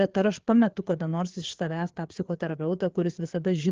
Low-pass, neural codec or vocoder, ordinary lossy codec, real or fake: 7.2 kHz; codec, 16 kHz, 4 kbps, FreqCodec, larger model; Opus, 16 kbps; fake